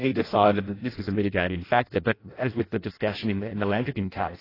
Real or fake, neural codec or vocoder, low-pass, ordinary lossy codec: fake; codec, 16 kHz in and 24 kHz out, 0.6 kbps, FireRedTTS-2 codec; 5.4 kHz; AAC, 24 kbps